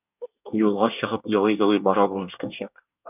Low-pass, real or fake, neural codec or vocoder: 3.6 kHz; fake; codec, 24 kHz, 1 kbps, SNAC